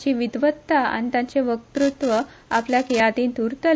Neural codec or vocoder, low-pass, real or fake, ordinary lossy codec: none; none; real; none